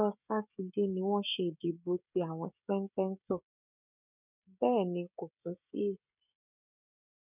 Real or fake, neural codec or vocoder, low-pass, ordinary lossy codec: fake; codec, 16 kHz, 16 kbps, FreqCodec, smaller model; 3.6 kHz; none